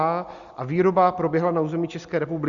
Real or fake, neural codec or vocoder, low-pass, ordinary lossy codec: real; none; 7.2 kHz; Opus, 64 kbps